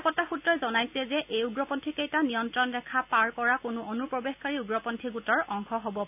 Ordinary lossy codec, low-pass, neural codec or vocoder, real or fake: none; 3.6 kHz; none; real